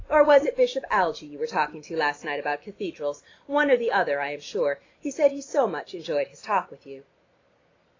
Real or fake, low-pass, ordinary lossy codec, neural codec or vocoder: real; 7.2 kHz; AAC, 32 kbps; none